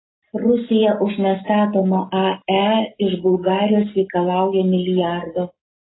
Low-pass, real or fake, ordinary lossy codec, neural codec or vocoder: 7.2 kHz; real; AAC, 16 kbps; none